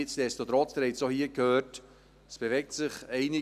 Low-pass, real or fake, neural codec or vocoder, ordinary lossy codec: 14.4 kHz; real; none; none